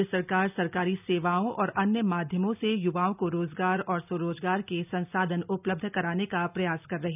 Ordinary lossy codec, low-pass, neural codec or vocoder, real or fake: none; 3.6 kHz; none; real